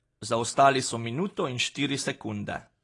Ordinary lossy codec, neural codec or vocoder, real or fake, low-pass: AAC, 32 kbps; none; real; 10.8 kHz